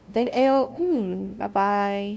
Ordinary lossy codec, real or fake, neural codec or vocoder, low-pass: none; fake; codec, 16 kHz, 0.5 kbps, FunCodec, trained on LibriTTS, 25 frames a second; none